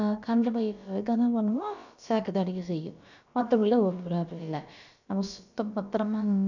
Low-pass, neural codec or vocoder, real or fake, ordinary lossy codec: 7.2 kHz; codec, 16 kHz, about 1 kbps, DyCAST, with the encoder's durations; fake; none